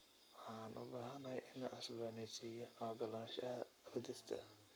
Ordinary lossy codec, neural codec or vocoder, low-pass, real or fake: none; codec, 44.1 kHz, 7.8 kbps, Pupu-Codec; none; fake